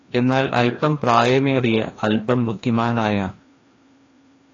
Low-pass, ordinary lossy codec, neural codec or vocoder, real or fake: 7.2 kHz; AAC, 32 kbps; codec, 16 kHz, 1 kbps, FreqCodec, larger model; fake